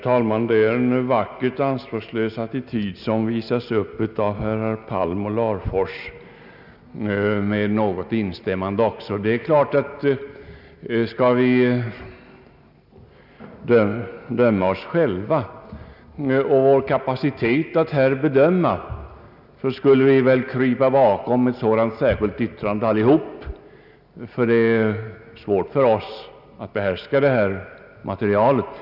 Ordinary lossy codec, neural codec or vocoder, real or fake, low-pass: none; none; real; 5.4 kHz